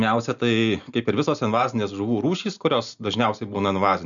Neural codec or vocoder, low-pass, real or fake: none; 7.2 kHz; real